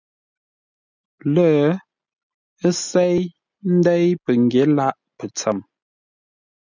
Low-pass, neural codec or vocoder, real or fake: 7.2 kHz; none; real